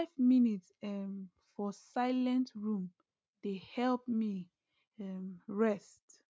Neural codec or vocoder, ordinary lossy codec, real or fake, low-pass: none; none; real; none